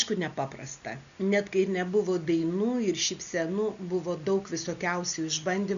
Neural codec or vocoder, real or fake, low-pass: none; real; 7.2 kHz